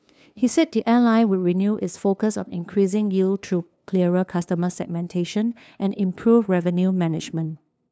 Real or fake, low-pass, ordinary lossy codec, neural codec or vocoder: fake; none; none; codec, 16 kHz, 2 kbps, FunCodec, trained on LibriTTS, 25 frames a second